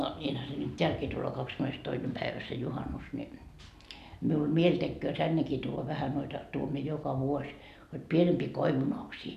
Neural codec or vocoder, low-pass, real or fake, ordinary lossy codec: none; 14.4 kHz; real; none